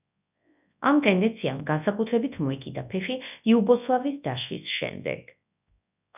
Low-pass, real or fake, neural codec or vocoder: 3.6 kHz; fake; codec, 24 kHz, 0.9 kbps, WavTokenizer, large speech release